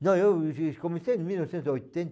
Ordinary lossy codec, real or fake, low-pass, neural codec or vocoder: none; real; none; none